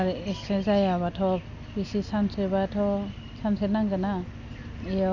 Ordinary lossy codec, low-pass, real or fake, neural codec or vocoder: none; 7.2 kHz; real; none